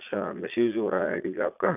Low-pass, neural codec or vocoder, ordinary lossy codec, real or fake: 3.6 kHz; vocoder, 22.05 kHz, 80 mel bands, Vocos; none; fake